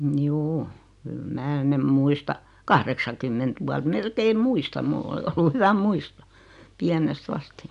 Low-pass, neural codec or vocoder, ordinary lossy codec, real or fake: 10.8 kHz; none; none; real